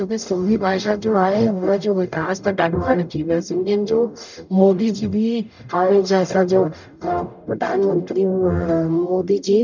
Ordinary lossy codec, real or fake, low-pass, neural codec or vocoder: none; fake; 7.2 kHz; codec, 44.1 kHz, 0.9 kbps, DAC